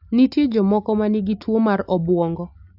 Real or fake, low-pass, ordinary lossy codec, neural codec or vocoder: real; 5.4 kHz; AAC, 48 kbps; none